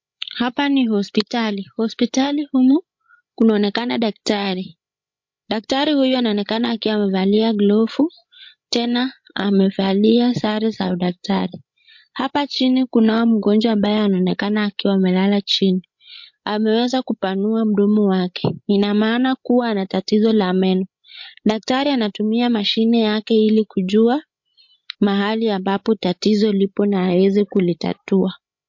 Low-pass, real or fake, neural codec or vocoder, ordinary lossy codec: 7.2 kHz; fake; codec, 16 kHz, 16 kbps, FreqCodec, larger model; MP3, 48 kbps